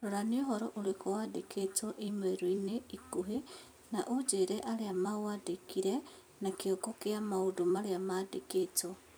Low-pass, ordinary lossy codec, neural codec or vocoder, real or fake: none; none; vocoder, 44.1 kHz, 128 mel bands every 256 samples, BigVGAN v2; fake